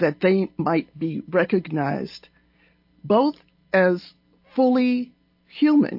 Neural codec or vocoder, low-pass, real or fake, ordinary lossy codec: none; 5.4 kHz; real; AAC, 48 kbps